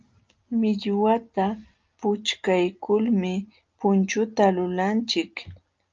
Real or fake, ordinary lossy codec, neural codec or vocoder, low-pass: real; Opus, 24 kbps; none; 7.2 kHz